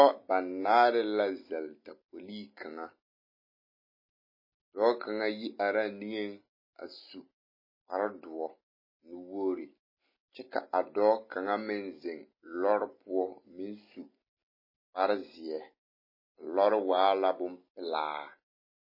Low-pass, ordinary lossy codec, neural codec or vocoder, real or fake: 5.4 kHz; MP3, 24 kbps; none; real